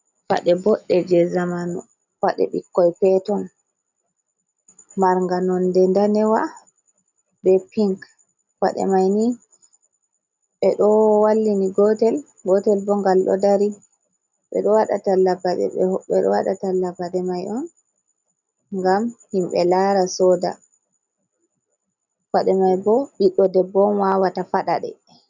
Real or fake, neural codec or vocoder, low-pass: real; none; 7.2 kHz